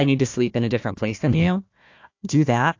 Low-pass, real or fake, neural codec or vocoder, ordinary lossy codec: 7.2 kHz; fake; codec, 16 kHz, 1 kbps, FunCodec, trained on Chinese and English, 50 frames a second; AAC, 48 kbps